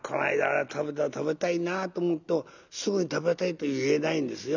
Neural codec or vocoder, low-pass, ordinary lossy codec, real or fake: none; 7.2 kHz; none; real